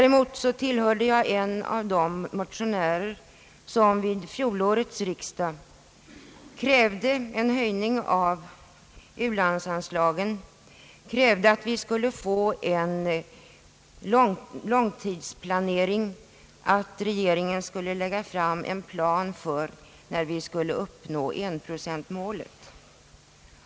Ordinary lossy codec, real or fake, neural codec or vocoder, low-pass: none; real; none; none